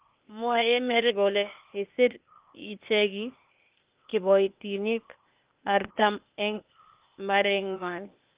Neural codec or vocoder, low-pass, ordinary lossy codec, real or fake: codec, 16 kHz, 0.8 kbps, ZipCodec; 3.6 kHz; Opus, 32 kbps; fake